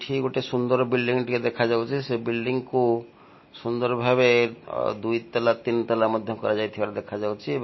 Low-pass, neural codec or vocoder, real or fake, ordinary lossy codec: 7.2 kHz; none; real; MP3, 24 kbps